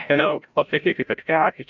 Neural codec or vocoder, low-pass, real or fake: codec, 16 kHz, 0.5 kbps, FreqCodec, larger model; 7.2 kHz; fake